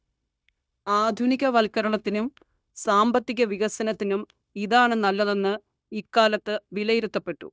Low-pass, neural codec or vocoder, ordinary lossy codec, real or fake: none; codec, 16 kHz, 0.9 kbps, LongCat-Audio-Codec; none; fake